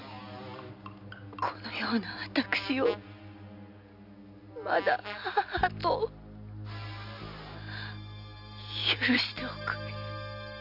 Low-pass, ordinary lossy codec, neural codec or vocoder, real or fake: 5.4 kHz; AAC, 48 kbps; none; real